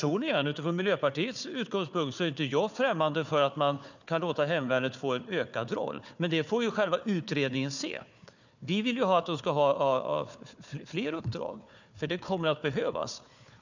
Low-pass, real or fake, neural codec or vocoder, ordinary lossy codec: 7.2 kHz; fake; codec, 16 kHz, 4 kbps, FunCodec, trained on Chinese and English, 50 frames a second; none